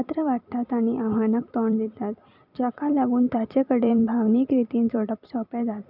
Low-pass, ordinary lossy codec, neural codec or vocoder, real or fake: 5.4 kHz; none; vocoder, 44.1 kHz, 128 mel bands every 256 samples, BigVGAN v2; fake